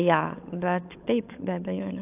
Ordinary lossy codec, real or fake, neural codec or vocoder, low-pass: AAC, 32 kbps; fake; codec, 16 kHz, 16 kbps, FunCodec, trained on LibriTTS, 50 frames a second; 3.6 kHz